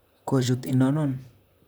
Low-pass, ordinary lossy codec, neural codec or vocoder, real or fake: none; none; vocoder, 44.1 kHz, 128 mel bands, Pupu-Vocoder; fake